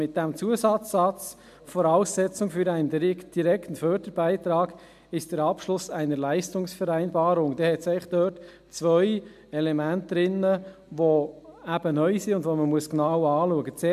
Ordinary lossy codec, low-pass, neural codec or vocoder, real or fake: MP3, 96 kbps; 14.4 kHz; none; real